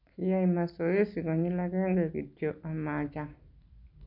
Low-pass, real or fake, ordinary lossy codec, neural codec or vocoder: 5.4 kHz; real; none; none